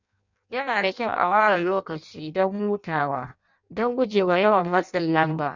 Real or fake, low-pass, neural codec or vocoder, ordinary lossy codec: fake; 7.2 kHz; codec, 16 kHz in and 24 kHz out, 0.6 kbps, FireRedTTS-2 codec; none